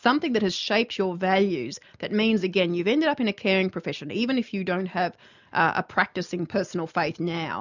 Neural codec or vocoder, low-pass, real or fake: none; 7.2 kHz; real